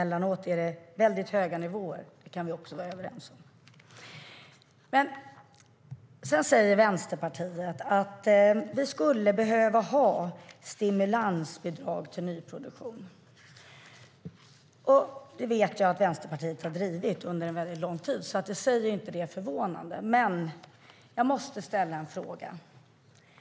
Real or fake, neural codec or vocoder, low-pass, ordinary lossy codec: real; none; none; none